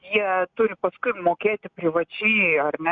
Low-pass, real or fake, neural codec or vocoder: 7.2 kHz; real; none